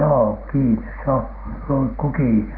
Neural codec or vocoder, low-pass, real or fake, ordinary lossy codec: none; 5.4 kHz; real; Opus, 24 kbps